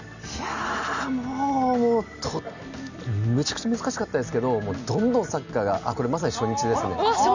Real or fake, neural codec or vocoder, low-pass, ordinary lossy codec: real; none; 7.2 kHz; none